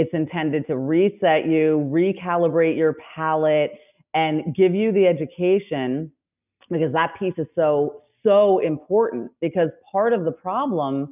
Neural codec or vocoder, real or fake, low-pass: none; real; 3.6 kHz